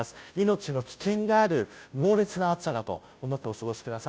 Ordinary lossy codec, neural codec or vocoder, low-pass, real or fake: none; codec, 16 kHz, 0.5 kbps, FunCodec, trained on Chinese and English, 25 frames a second; none; fake